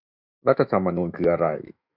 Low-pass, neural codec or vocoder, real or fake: 5.4 kHz; autoencoder, 48 kHz, 128 numbers a frame, DAC-VAE, trained on Japanese speech; fake